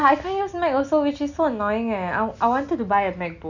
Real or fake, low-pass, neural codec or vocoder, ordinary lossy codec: real; 7.2 kHz; none; none